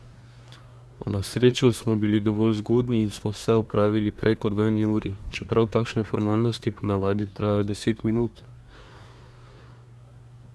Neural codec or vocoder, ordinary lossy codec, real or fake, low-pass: codec, 24 kHz, 1 kbps, SNAC; none; fake; none